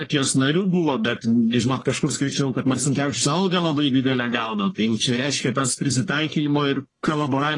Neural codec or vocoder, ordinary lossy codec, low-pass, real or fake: codec, 44.1 kHz, 1.7 kbps, Pupu-Codec; AAC, 32 kbps; 10.8 kHz; fake